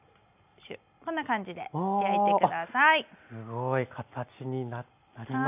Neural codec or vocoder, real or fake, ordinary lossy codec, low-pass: none; real; none; 3.6 kHz